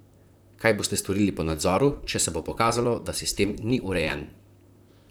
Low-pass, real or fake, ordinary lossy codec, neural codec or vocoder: none; fake; none; vocoder, 44.1 kHz, 128 mel bands, Pupu-Vocoder